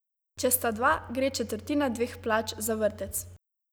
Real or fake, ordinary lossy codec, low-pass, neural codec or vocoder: real; none; none; none